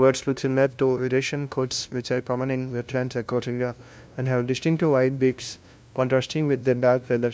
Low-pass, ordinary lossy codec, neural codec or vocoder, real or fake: none; none; codec, 16 kHz, 0.5 kbps, FunCodec, trained on LibriTTS, 25 frames a second; fake